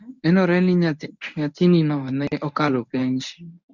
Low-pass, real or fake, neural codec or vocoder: 7.2 kHz; fake; codec, 24 kHz, 0.9 kbps, WavTokenizer, medium speech release version 1